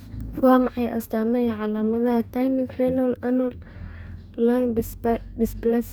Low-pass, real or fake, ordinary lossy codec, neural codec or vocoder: none; fake; none; codec, 44.1 kHz, 2.6 kbps, DAC